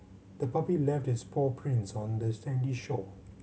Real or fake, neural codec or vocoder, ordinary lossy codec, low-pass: real; none; none; none